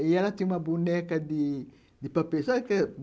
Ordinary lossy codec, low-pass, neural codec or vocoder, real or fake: none; none; none; real